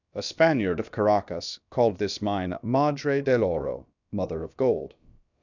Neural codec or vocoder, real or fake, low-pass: codec, 16 kHz, about 1 kbps, DyCAST, with the encoder's durations; fake; 7.2 kHz